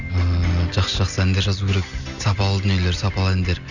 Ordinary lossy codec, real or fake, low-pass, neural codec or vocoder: none; real; 7.2 kHz; none